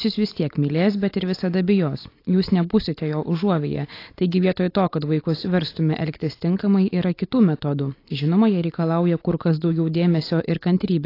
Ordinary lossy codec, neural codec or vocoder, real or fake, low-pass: AAC, 32 kbps; none; real; 5.4 kHz